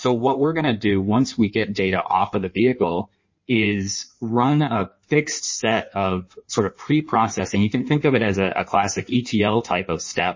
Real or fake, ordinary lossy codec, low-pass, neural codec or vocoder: fake; MP3, 32 kbps; 7.2 kHz; codec, 16 kHz in and 24 kHz out, 1.1 kbps, FireRedTTS-2 codec